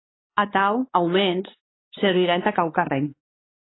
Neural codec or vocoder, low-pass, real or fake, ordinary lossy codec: codec, 16 kHz, 4 kbps, X-Codec, WavLM features, trained on Multilingual LibriSpeech; 7.2 kHz; fake; AAC, 16 kbps